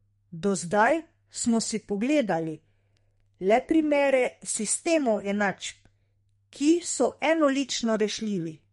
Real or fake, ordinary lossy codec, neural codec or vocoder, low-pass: fake; MP3, 48 kbps; codec, 32 kHz, 1.9 kbps, SNAC; 14.4 kHz